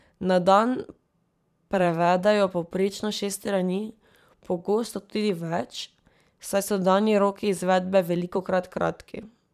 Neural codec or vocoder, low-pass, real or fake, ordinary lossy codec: vocoder, 44.1 kHz, 128 mel bands every 512 samples, BigVGAN v2; 14.4 kHz; fake; none